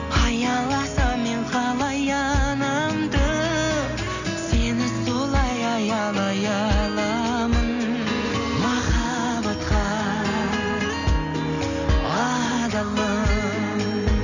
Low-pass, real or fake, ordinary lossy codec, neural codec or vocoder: 7.2 kHz; real; none; none